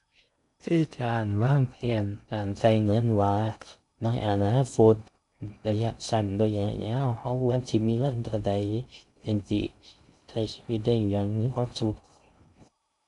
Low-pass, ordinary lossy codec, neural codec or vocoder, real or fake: 10.8 kHz; none; codec, 16 kHz in and 24 kHz out, 0.6 kbps, FocalCodec, streaming, 2048 codes; fake